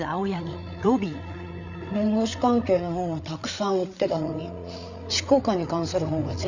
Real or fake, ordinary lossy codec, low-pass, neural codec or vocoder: fake; none; 7.2 kHz; codec, 16 kHz, 8 kbps, FreqCodec, larger model